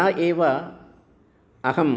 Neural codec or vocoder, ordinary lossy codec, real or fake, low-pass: none; none; real; none